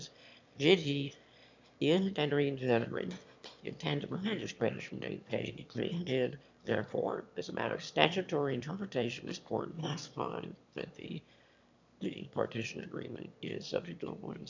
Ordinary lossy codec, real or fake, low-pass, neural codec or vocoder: MP3, 64 kbps; fake; 7.2 kHz; autoencoder, 22.05 kHz, a latent of 192 numbers a frame, VITS, trained on one speaker